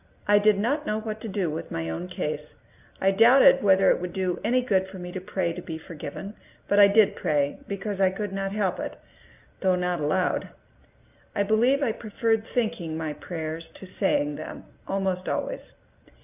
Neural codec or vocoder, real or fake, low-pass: none; real; 3.6 kHz